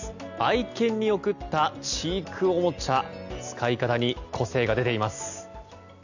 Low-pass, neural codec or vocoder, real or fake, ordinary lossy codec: 7.2 kHz; none; real; none